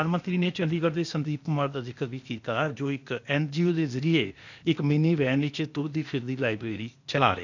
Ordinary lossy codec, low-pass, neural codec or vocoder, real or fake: none; 7.2 kHz; codec, 16 kHz, 0.8 kbps, ZipCodec; fake